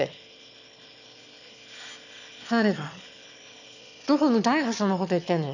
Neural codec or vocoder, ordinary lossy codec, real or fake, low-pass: autoencoder, 22.05 kHz, a latent of 192 numbers a frame, VITS, trained on one speaker; none; fake; 7.2 kHz